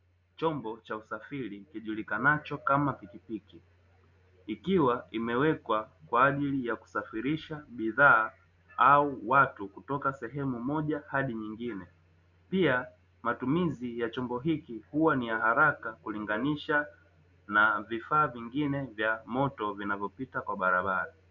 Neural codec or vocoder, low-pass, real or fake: none; 7.2 kHz; real